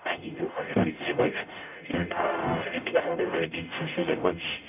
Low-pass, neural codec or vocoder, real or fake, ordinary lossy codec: 3.6 kHz; codec, 44.1 kHz, 0.9 kbps, DAC; fake; none